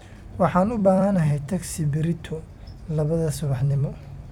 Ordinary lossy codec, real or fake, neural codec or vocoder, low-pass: none; fake; vocoder, 44.1 kHz, 128 mel bands every 256 samples, BigVGAN v2; 19.8 kHz